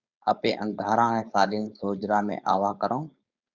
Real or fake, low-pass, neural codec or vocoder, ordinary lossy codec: fake; 7.2 kHz; codec, 16 kHz, 4.8 kbps, FACodec; Opus, 64 kbps